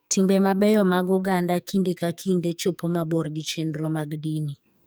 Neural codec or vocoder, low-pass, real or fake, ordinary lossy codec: codec, 44.1 kHz, 2.6 kbps, SNAC; none; fake; none